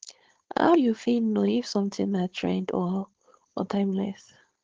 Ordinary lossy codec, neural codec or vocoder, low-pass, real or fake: Opus, 16 kbps; codec, 16 kHz, 4.8 kbps, FACodec; 7.2 kHz; fake